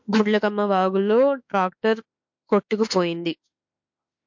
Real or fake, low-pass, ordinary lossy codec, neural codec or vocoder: fake; 7.2 kHz; MP3, 48 kbps; autoencoder, 48 kHz, 32 numbers a frame, DAC-VAE, trained on Japanese speech